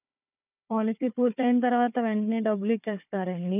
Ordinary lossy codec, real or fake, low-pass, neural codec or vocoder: none; fake; 3.6 kHz; codec, 16 kHz, 4 kbps, FunCodec, trained on Chinese and English, 50 frames a second